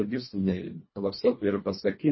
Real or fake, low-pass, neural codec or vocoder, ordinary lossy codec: fake; 7.2 kHz; codec, 24 kHz, 1.5 kbps, HILCodec; MP3, 24 kbps